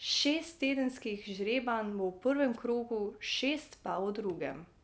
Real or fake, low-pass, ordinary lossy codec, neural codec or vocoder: real; none; none; none